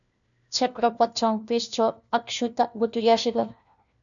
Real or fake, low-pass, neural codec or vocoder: fake; 7.2 kHz; codec, 16 kHz, 1 kbps, FunCodec, trained on LibriTTS, 50 frames a second